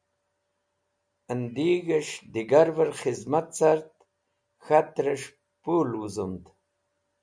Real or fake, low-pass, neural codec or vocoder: real; 9.9 kHz; none